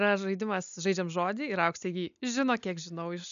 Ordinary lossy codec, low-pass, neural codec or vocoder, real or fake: AAC, 96 kbps; 7.2 kHz; none; real